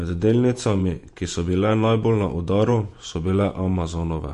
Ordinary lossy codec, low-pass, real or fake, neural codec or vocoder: MP3, 48 kbps; 14.4 kHz; fake; vocoder, 48 kHz, 128 mel bands, Vocos